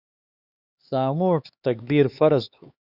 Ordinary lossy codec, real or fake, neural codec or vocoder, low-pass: Opus, 64 kbps; fake; codec, 16 kHz, 4 kbps, X-Codec, HuBERT features, trained on LibriSpeech; 5.4 kHz